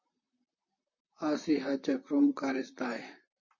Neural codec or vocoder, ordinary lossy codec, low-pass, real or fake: vocoder, 44.1 kHz, 128 mel bands, Pupu-Vocoder; MP3, 32 kbps; 7.2 kHz; fake